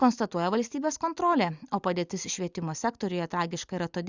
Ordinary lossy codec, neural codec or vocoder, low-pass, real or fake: Opus, 64 kbps; none; 7.2 kHz; real